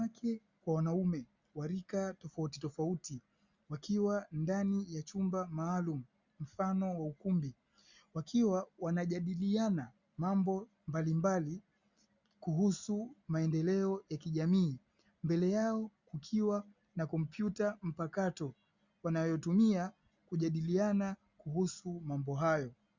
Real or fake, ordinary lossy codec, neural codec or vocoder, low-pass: real; Opus, 64 kbps; none; 7.2 kHz